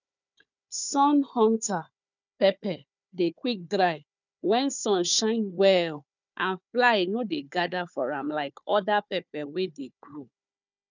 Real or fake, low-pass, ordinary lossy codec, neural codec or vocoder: fake; 7.2 kHz; none; codec, 16 kHz, 4 kbps, FunCodec, trained on Chinese and English, 50 frames a second